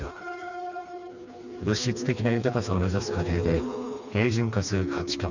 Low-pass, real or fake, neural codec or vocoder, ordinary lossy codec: 7.2 kHz; fake; codec, 16 kHz, 2 kbps, FreqCodec, smaller model; none